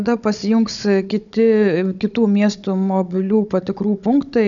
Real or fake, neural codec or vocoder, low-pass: fake; codec, 16 kHz, 16 kbps, FunCodec, trained on Chinese and English, 50 frames a second; 7.2 kHz